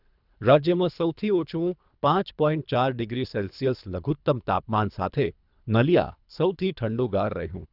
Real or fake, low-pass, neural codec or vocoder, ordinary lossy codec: fake; 5.4 kHz; codec, 24 kHz, 3 kbps, HILCodec; none